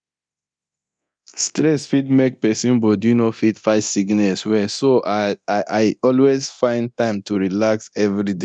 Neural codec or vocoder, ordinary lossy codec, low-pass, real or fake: codec, 24 kHz, 0.9 kbps, DualCodec; none; 9.9 kHz; fake